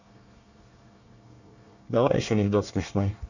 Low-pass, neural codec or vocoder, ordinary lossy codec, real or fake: 7.2 kHz; codec, 24 kHz, 1 kbps, SNAC; none; fake